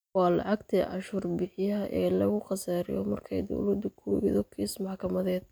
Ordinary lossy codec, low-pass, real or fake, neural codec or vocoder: none; none; real; none